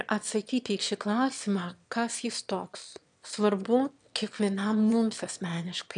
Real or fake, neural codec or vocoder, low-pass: fake; autoencoder, 22.05 kHz, a latent of 192 numbers a frame, VITS, trained on one speaker; 9.9 kHz